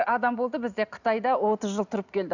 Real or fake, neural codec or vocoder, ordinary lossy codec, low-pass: real; none; none; 7.2 kHz